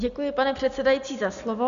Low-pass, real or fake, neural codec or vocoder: 7.2 kHz; real; none